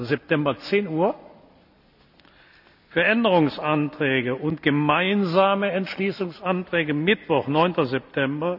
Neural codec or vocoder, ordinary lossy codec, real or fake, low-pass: none; none; real; 5.4 kHz